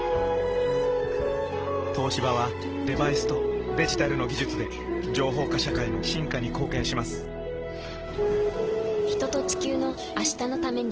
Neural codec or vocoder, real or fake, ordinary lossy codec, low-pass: none; real; Opus, 16 kbps; 7.2 kHz